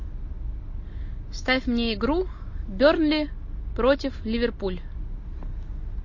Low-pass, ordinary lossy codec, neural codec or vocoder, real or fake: 7.2 kHz; MP3, 32 kbps; none; real